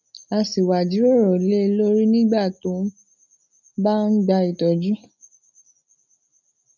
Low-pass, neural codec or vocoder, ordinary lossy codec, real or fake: 7.2 kHz; none; none; real